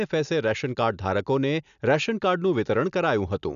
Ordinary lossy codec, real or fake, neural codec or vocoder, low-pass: none; real; none; 7.2 kHz